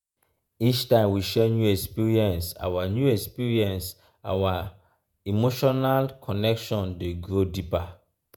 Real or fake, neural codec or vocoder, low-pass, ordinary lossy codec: fake; vocoder, 48 kHz, 128 mel bands, Vocos; none; none